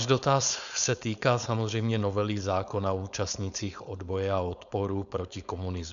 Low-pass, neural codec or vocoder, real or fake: 7.2 kHz; codec, 16 kHz, 4.8 kbps, FACodec; fake